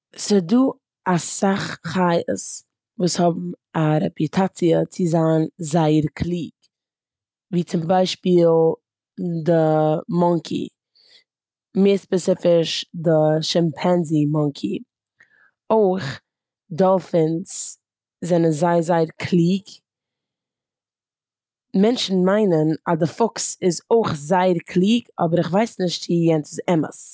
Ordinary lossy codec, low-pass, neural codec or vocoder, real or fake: none; none; none; real